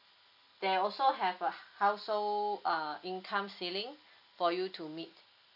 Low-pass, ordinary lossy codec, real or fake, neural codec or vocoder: 5.4 kHz; none; real; none